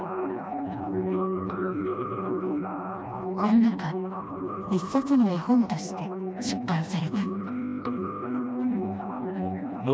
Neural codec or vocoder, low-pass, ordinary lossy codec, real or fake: codec, 16 kHz, 1 kbps, FreqCodec, smaller model; none; none; fake